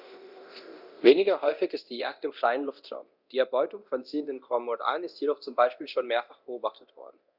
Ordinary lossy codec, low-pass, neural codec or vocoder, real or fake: Opus, 64 kbps; 5.4 kHz; codec, 24 kHz, 0.9 kbps, DualCodec; fake